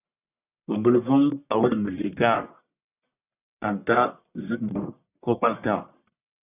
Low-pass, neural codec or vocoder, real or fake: 3.6 kHz; codec, 44.1 kHz, 1.7 kbps, Pupu-Codec; fake